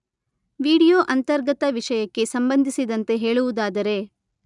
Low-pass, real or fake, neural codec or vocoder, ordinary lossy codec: 10.8 kHz; real; none; none